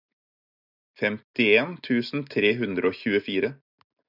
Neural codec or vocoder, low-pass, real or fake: none; 5.4 kHz; real